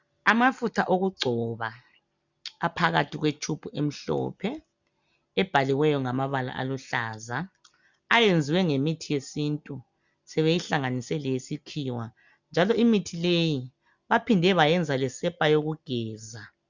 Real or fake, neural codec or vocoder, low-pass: real; none; 7.2 kHz